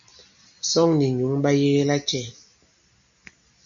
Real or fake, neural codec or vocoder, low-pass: real; none; 7.2 kHz